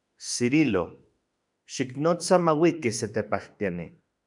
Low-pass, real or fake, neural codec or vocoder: 10.8 kHz; fake; autoencoder, 48 kHz, 32 numbers a frame, DAC-VAE, trained on Japanese speech